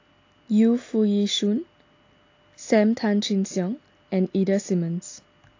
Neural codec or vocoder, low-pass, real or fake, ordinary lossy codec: none; 7.2 kHz; real; AAC, 48 kbps